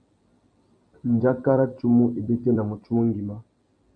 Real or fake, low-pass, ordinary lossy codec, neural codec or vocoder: fake; 9.9 kHz; MP3, 48 kbps; vocoder, 44.1 kHz, 128 mel bands every 256 samples, BigVGAN v2